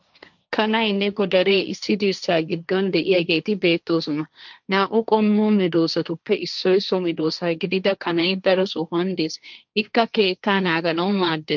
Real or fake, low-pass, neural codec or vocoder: fake; 7.2 kHz; codec, 16 kHz, 1.1 kbps, Voila-Tokenizer